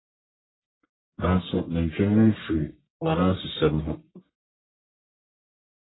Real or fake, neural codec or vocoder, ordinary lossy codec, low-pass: fake; codec, 44.1 kHz, 1.7 kbps, Pupu-Codec; AAC, 16 kbps; 7.2 kHz